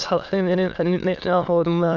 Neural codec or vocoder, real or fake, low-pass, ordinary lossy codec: autoencoder, 22.05 kHz, a latent of 192 numbers a frame, VITS, trained on many speakers; fake; 7.2 kHz; none